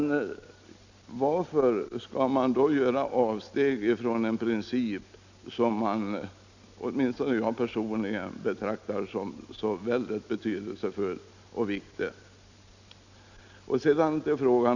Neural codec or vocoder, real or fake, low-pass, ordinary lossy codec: none; real; 7.2 kHz; none